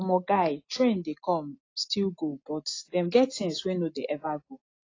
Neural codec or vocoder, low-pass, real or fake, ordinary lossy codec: none; 7.2 kHz; real; AAC, 32 kbps